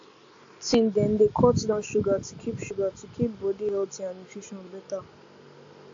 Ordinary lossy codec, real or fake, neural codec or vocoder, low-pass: AAC, 48 kbps; real; none; 7.2 kHz